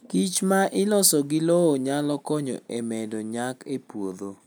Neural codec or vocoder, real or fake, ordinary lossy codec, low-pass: none; real; none; none